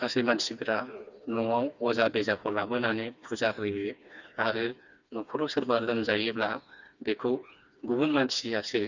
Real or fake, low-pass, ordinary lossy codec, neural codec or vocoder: fake; 7.2 kHz; Opus, 64 kbps; codec, 16 kHz, 2 kbps, FreqCodec, smaller model